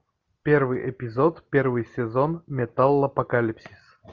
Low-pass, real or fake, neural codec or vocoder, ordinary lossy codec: 7.2 kHz; real; none; Opus, 32 kbps